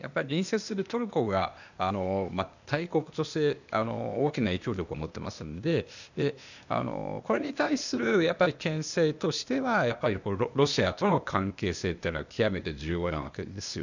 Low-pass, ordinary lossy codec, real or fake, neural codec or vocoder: 7.2 kHz; none; fake; codec, 16 kHz, 0.8 kbps, ZipCodec